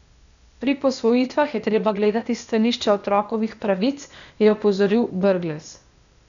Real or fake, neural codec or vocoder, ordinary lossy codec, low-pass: fake; codec, 16 kHz, 0.8 kbps, ZipCodec; none; 7.2 kHz